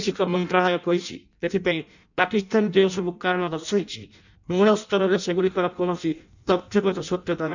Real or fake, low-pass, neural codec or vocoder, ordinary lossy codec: fake; 7.2 kHz; codec, 16 kHz in and 24 kHz out, 0.6 kbps, FireRedTTS-2 codec; none